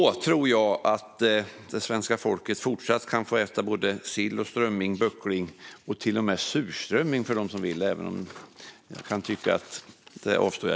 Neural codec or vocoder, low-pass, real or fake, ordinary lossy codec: none; none; real; none